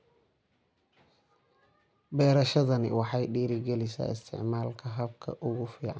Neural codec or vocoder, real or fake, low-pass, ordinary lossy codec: none; real; none; none